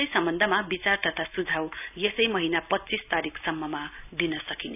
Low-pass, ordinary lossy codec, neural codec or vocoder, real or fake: 3.6 kHz; none; none; real